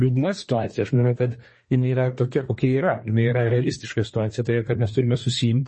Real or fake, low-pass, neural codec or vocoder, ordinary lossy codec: fake; 10.8 kHz; codec, 24 kHz, 1 kbps, SNAC; MP3, 32 kbps